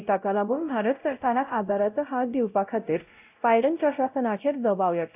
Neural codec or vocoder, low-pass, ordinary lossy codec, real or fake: codec, 16 kHz, 0.5 kbps, X-Codec, WavLM features, trained on Multilingual LibriSpeech; 3.6 kHz; AAC, 32 kbps; fake